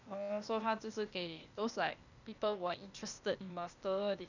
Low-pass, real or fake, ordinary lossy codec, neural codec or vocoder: 7.2 kHz; fake; none; codec, 16 kHz, 0.8 kbps, ZipCodec